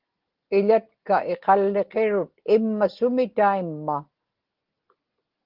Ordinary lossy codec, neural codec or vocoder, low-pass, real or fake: Opus, 16 kbps; none; 5.4 kHz; real